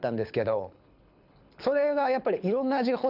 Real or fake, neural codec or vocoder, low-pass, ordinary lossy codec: fake; codec, 24 kHz, 6 kbps, HILCodec; 5.4 kHz; none